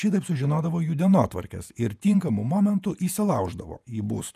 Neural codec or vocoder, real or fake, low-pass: vocoder, 48 kHz, 128 mel bands, Vocos; fake; 14.4 kHz